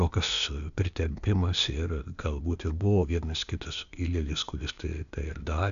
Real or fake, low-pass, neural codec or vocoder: fake; 7.2 kHz; codec, 16 kHz, 0.8 kbps, ZipCodec